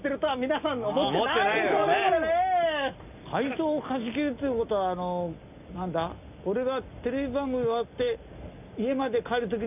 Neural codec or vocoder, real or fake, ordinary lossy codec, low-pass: none; real; none; 3.6 kHz